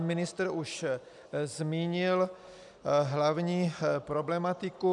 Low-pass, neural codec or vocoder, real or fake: 10.8 kHz; none; real